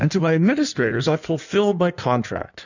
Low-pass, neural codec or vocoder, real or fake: 7.2 kHz; codec, 16 kHz in and 24 kHz out, 1.1 kbps, FireRedTTS-2 codec; fake